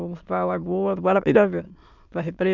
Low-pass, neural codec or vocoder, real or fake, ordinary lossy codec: 7.2 kHz; autoencoder, 22.05 kHz, a latent of 192 numbers a frame, VITS, trained on many speakers; fake; none